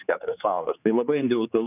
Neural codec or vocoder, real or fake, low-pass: codec, 16 kHz, 4 kbps, X-Codec, HuBERT features, trained on general audio; fake; 3.6 kHz